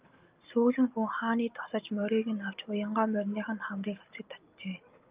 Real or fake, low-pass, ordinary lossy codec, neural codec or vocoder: real; 3.6 kHz; Opus, 24 kbps; none